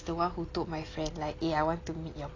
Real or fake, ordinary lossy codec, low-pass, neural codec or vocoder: real; AAC, 32 kbps; 7.2 kHz; none